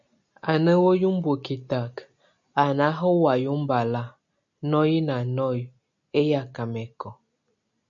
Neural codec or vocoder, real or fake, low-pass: none; real; 7.2 kHz